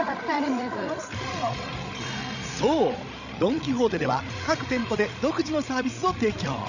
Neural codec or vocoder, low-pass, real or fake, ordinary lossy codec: codec, 16 kHz, 16 kbps, FreqCodec, larger model; 7.2 kHz; fake; none